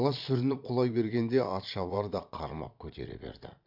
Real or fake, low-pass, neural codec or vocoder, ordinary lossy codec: fake; 5.4 kHz; vocoder, 22.05 kHz, 80 mel bands, Vocos; MP3, 48 kbps